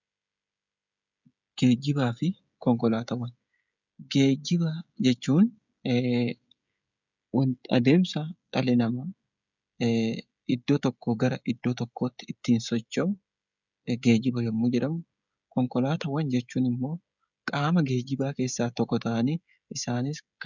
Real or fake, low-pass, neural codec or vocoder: fake; 7.2 kHz; codec, 16 kHz, 16 kbps, FreqCodec, smaller model